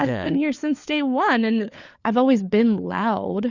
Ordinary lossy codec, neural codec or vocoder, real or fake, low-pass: Opus, 64 kbps; codec, 16 kHz, 4 kbps, FunCodec, trained on LibriTTS, 50 frames a second; fake; 7.2 kHz